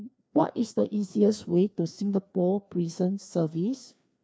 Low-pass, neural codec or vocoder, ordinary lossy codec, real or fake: none; codec, 16 kHz, 2 kbps, FreqCodec, larger model; none; fake